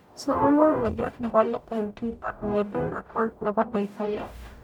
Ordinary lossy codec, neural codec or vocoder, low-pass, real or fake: none; codec, 44.1 kHz, 0.9 kbps, DAC; 19.8 kHz; fake